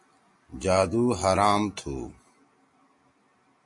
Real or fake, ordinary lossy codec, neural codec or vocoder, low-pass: real; MP3, 48 kbps; none; 10.8 kHz